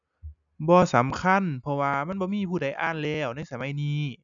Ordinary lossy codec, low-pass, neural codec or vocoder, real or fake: none; 7.2 kHz; none; real